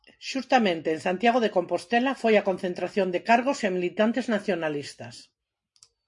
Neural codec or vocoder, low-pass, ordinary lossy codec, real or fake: none; 10.8 kHz; MP3, 48 kbps; real